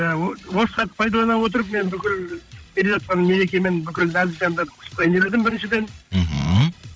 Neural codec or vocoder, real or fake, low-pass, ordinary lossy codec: codec, 16 kHz, 16 kbps, FreqCodec, larger model; fake; none; none